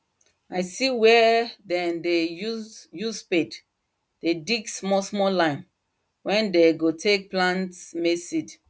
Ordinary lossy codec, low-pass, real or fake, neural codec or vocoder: none; none; real; none